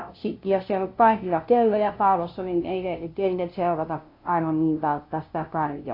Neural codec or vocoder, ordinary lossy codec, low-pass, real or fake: codec, 16 kHz, 0.5 kbps, FunCodec, trained on LibriTTS, 25 frames a second; AAC, 32 kbps; 5.4 kHz; fake